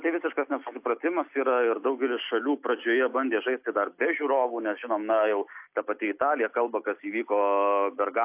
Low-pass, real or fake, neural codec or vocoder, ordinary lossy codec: 3.6 kHz; real; none; AAC, 32 kbps